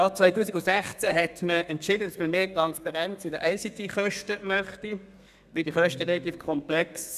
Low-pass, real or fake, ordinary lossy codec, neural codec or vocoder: 14.4 kHz; fake; none; codec, 32 kHz, 1.9 kbps, SNAC